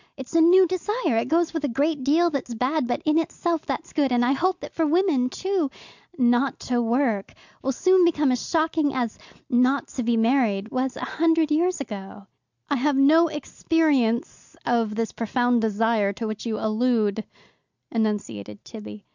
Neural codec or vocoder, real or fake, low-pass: none; real; 7.2 kHz